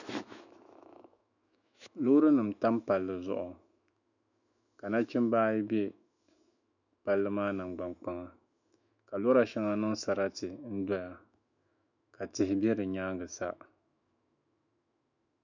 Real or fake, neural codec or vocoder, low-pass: fake; autoencoder, 48 kHz, 128 numbers a frame, DAC-VAE, trained on Japanese speech; 7.2 kHz